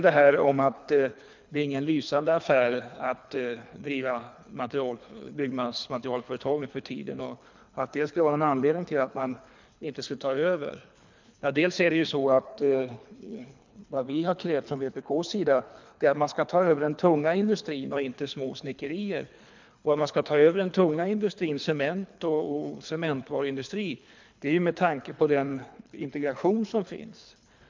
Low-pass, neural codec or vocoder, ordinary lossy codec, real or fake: 7.2 kHz; codec, 24 kHz, 3 kbps, HILCodec; MP3, 64 kbps; fake